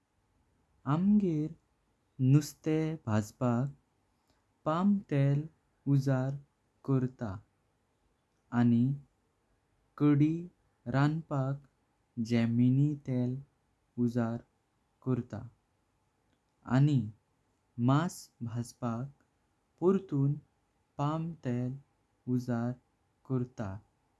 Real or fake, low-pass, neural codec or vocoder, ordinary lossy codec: real; none; none; none